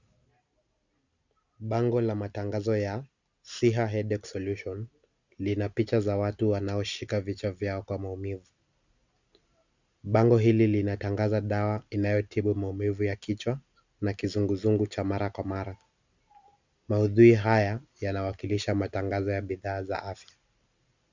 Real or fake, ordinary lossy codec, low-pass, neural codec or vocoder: real; Opus, 64 kbps; 7.2 kHz; none